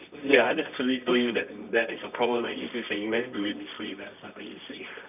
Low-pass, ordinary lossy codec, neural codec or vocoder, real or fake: 3.6 kHz; none; codec, 24 kHz, 0.9 kbps, WavTokenizer, medium music audio release; fake